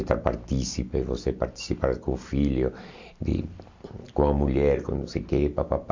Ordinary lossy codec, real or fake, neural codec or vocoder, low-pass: MP3, 48 kbps; real; none; 7.2 kHz